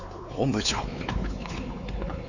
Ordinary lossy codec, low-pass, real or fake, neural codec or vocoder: none; 7.2 kHz; fake; codec, 16 kHz, 4 kbps, X-Codec, WavLM features, trained on Multilingual LibriSpeech